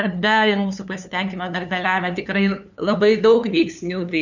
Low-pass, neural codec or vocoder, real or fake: 7.2 kHz; codec, 16 kHz, 2 kbps, FunCodec, trained on LibriTTS, 25 frames a second; fake